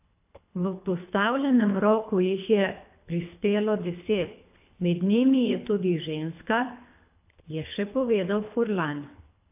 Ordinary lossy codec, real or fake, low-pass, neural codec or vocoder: AAC, 32 kbps; fake; 3.6 kHz; codec, 24 kHz, 3 kbps, HILCodec